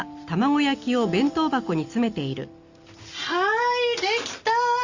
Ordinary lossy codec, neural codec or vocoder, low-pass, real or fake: Opus, 64 kbps; none; 7.2 kHz; real